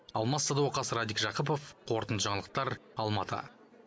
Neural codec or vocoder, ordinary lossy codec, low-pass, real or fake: none; none; none; real